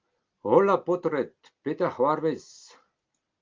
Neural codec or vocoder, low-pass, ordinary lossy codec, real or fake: none; 7.2 kHz; Opus, 32 kbps; real